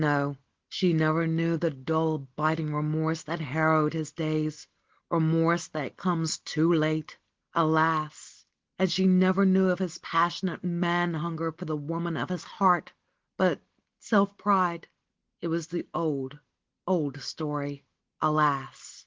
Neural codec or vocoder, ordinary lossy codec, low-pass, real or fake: none; Opus, 16 kbps; 7.2 kHz; real